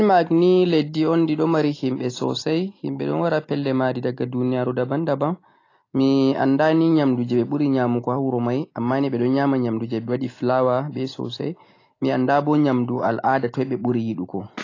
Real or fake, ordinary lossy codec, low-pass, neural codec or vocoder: real; AAC, 32 kbps; 7.2 kHz; none